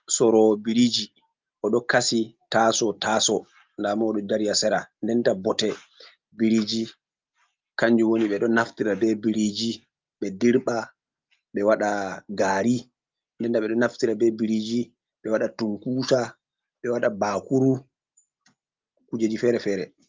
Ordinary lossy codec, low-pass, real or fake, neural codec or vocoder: Opus, 32 kbps; 7.2 kHz; real; none